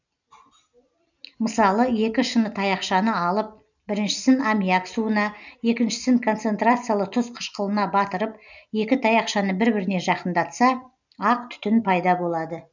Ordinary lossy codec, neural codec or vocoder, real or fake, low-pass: none; none; real; 7.2 kHz